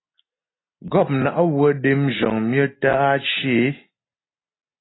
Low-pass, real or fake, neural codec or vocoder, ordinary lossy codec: 7.2 kHz; real; none; AAC, 16 kbps